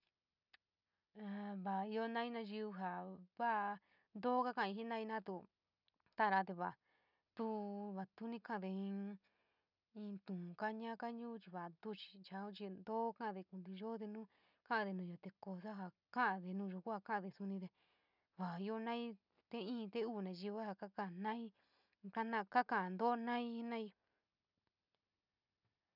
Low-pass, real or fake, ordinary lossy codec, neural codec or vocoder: 5.4 kHz; real; none; none